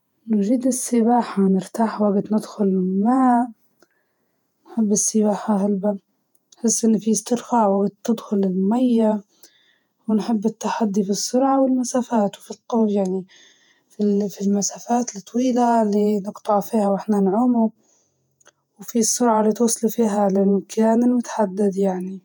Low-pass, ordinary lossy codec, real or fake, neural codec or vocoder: 19.8 kHz; none; fake; vocoder, 48 kHz, 128 mel bands, Vocos